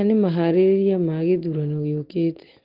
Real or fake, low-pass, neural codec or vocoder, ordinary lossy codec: real; 5.4 kHz; none; Opus, 16 kbps